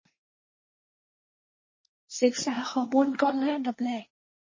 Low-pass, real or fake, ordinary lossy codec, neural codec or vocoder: 7.2 kHz; fake; MP3, 32 kbps; codec, 24 kHz, 1 kbps, SNAC